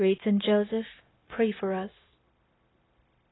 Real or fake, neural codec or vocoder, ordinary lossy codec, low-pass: fake; codec, 16 kHz, 0.9 kbps, LongCat-Audio-Codec; AAC, 16 kbps; 7.2 kHz